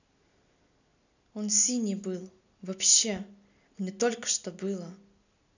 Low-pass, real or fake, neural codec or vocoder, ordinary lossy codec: 7.2 kHz; real; none; none